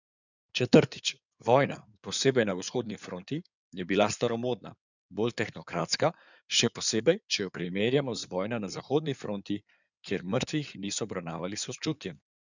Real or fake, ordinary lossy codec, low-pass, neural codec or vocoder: fake; none; 7.2 kHz; codec, 16 kHz in and 24 kHz out, 2.2 kbps, FireRedTTS-2 codec